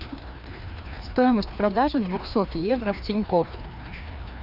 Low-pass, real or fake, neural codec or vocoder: 5.4 kHz; fake; codec, 16 kHz, 2 kbps, FreqCodec, larger model